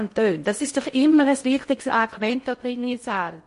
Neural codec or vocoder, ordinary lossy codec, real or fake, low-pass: codec, 16 kHz in and 24 kHz out, 0.6 kbps, FocalCodec, streaming, 4096 codes; MP3, 48 kbps; fake; 10.8 kHz